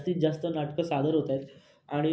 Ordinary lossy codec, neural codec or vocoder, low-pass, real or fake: none; none; none; real